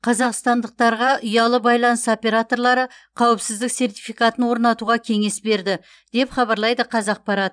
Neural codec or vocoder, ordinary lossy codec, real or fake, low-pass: vocoder, 44.1 kHz, 128 mel bands every 512 samples, BigVGAN v2; none; fake; 9.9 kHz